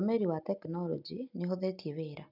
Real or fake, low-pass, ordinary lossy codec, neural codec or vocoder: real; 5.4 kHz; none; none